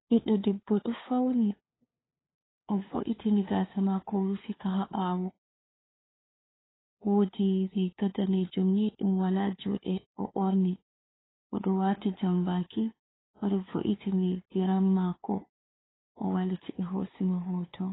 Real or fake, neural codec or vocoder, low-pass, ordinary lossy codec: fake; codec, 16 kHz, 2 kbps, FunCodec, trained on LibriTTS, 25 frames a second; 7.2 kHz; AAC, 16 kbps